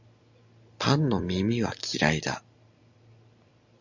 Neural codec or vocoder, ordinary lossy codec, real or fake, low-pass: none; Opus, 64 kbps; real; 7.2 kHz